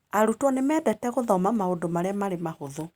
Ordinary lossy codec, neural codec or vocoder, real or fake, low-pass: Opus, 64 kbps; none; real; 19.8 kHz